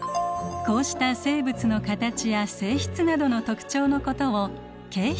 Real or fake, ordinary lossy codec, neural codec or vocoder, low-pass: real; none; none; none